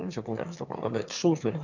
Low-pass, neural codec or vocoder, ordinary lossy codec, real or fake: 7.2 kHz; autoencoder, 22.05 kHz, a latent of 192 numbers a frame, VITS, trained on one speaker; none; fake